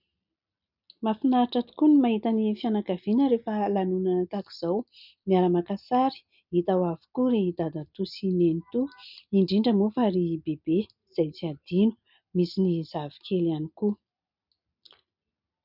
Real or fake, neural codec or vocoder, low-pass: real; none; 5.4 kHz